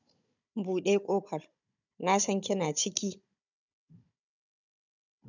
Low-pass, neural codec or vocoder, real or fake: 7.2 kHz; codec, 16 kHz, 16 kbps, FunCodec, trained on Chinese and English, 50 frames a second; fake